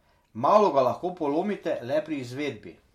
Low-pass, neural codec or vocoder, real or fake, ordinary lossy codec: 19.8 kHz; none; real; MP3, 64 kbps